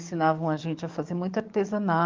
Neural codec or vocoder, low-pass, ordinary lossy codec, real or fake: codec, 44.1 kHz, 7.8 kbps, DAC; 7.2 kHz; Opus, 24 kbps; fake